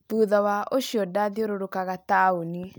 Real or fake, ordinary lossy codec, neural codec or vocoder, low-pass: real; none; none; none